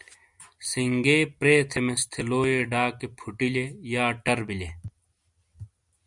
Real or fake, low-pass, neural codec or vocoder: real; 10.8 kHz; none